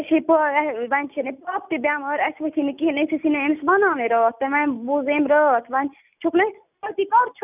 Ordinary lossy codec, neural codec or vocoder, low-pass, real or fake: none; none; 3.6 kHz; real